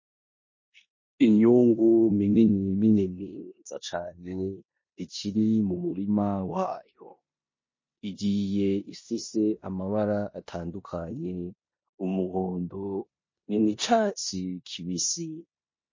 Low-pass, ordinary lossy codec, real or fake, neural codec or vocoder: 7.2 kHz; MP3, 32 kbps; fake; codec, 16 kHz in and 24 kHz out, 0.9 kbps, LongCat-Audio-Codec, four codebook decoder